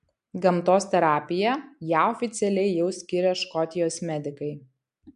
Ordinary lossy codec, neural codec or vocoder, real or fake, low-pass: MP3, 64 kbps; none; real; 10.8 kHz